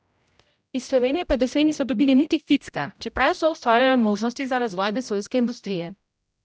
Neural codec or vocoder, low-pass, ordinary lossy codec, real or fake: codec, 16 kHz, 0.5 kbps, X-Codec, HuBERT features, trained on general audio; none; none; fake